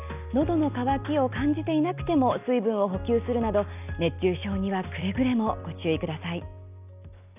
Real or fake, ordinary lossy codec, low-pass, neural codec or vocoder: real; none; 3.6 kHz; none